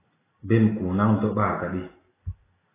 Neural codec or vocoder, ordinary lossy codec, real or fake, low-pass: none; AAC, 16 kbps; real; 3.6 kHz